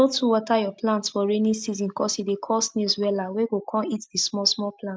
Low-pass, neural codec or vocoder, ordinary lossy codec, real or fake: none; none; none; real